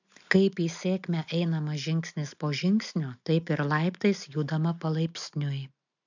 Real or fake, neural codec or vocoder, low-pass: real; none; 7.2 kHz